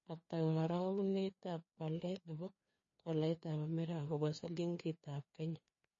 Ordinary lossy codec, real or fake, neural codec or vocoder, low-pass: MP3, 32 kbps; fake; codec, 16 kHz, 2 kbps, FreqCodec, larger model; 7.2 kHz